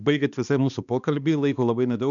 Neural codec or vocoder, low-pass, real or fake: codec, 16 kHz, 2 kbps, X-Codec, HuBERT features, trained on balanced general audio; 7.2 kHz; fake